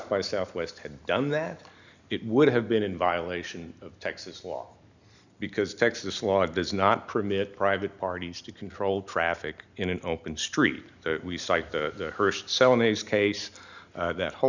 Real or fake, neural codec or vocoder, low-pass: real; none; 7.2 kHz